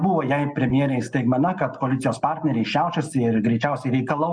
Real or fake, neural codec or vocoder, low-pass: real; none; 9.9 kHz